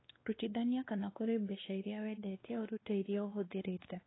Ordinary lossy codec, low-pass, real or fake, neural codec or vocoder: AAC, 16 kbps; 7.2 kHz; fake; codec, 16 kHz, 2 kbps, X-Codec, WavLM features, trained on Multilingual LibriSpeech